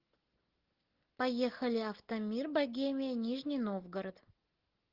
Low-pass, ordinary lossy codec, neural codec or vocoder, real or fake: 5.4 kHz; Opus, 16 kbps; none; real